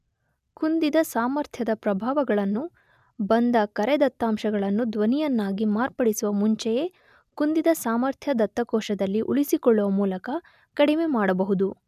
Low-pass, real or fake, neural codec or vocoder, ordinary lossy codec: 14.4 kHz; real; none; none